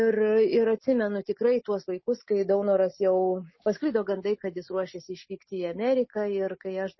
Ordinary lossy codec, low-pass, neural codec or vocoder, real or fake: MP3, 24 kbps; 7.2 kHz; none; real